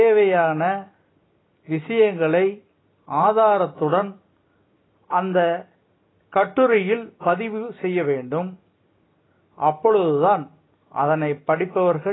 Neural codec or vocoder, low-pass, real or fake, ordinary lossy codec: none; 7.2 kHz; real; AAC, 16 kbps